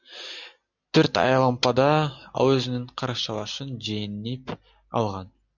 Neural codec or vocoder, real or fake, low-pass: none; real; 7.2 kHz